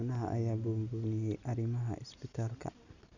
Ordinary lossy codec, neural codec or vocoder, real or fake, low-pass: none; none; real; 7.2 kHz